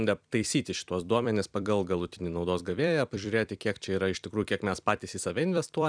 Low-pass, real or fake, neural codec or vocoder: 9.9 kHz; fake; vocoder, 44.1 kHz, 128 mel bands every 256 samples, BigVGAN v2